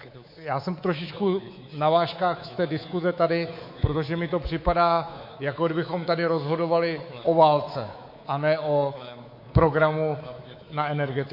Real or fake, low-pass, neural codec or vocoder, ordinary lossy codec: fake; 5.4 kHz; codec, 24 kHz, 3.1 kbps, DualCodec; MP3, 32 kbps